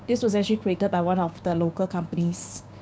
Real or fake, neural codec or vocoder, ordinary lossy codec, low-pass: fake; codec, 16 kHz, 6 kbps, DAC; none; none